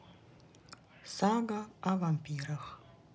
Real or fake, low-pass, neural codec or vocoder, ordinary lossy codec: real; none; none; none